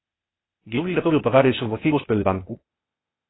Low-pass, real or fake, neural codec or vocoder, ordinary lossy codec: 7.2 kHz; fake; codec, 16 kHz, 0.8 kbps, ZipCodec; AAC, 16 kbps